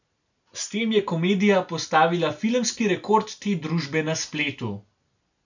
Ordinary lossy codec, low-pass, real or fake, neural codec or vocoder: none; 7.2 kHz; real; none